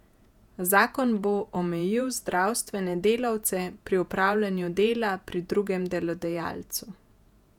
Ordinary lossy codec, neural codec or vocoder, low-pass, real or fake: none; vocoder, 48 kHz, 128 mel bands, Vocos; 19.8 kHz; fake